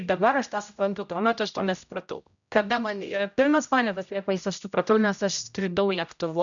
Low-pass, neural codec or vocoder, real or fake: 7.2 kHz; codec, 16 kHz, 0.5 kbps, X-Codec, HuBERT features, trained on general audio; fake